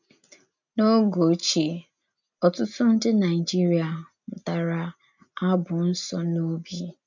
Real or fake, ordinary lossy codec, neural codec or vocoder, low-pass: real; none; none; 7.2 kHz